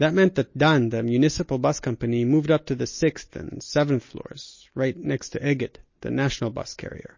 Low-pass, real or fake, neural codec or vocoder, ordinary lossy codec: 7.2 kHz; real; none; MP3, 32 kbps